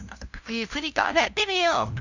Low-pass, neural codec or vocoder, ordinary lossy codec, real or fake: 7.2 kHz; codec, 16 kHz, 0.5 kbps, FunCodec, trained on LibriTTS, 25 frames a second; none; fake